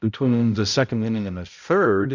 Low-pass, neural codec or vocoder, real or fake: 7.2 kHz; codec, 16 kHz, 0.5 kbps, X-Codec, HuBERT features, trained on balanced general audio; fake